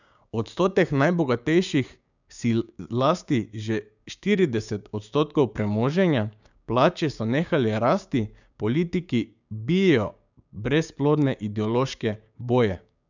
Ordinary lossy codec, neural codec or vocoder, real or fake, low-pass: none; codec, 16 kHz, 6 kbps, DAC; fake; 7.2 kHz